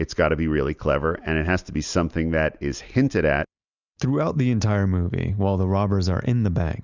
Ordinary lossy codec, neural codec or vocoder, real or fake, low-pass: Opus, 64 kbps; none; real; 7.2 kHz